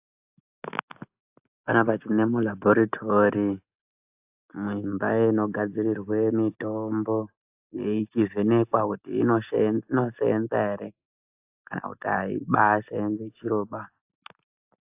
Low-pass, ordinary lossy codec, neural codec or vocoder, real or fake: 3.6 kHz; AAC, 32 kbps; none; real